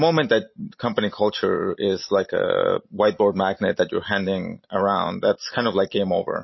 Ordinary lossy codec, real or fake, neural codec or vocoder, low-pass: MP3, 24 kbps; real; none; 7.2 kHz